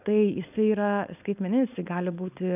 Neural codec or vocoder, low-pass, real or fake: codec, 16 kHz, 4.8 kbps, FACodec; 3.6 kHz; fake